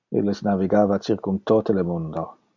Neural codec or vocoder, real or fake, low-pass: none; real; 7.2 kHz